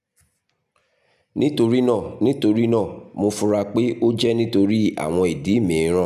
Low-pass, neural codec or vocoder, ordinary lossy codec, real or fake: 14.4 kHz; none; none; real